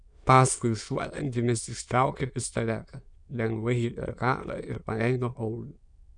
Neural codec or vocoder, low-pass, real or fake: autoencoder, 22.05 kHz, a latent of 192 numbers a frame, VITS, trained on many speakers; 9.9 kHz; fake